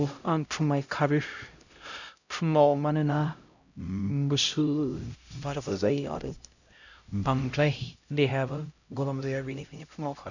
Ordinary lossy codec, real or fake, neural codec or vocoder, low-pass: none; fake; codec, 16 kHz, 0.5 kbps, X-Codec, HuBERT features, trained on LibriSpeech; 7.2 kHz